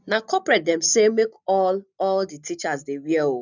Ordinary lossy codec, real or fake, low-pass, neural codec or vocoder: none; real; 7.2 kHz; none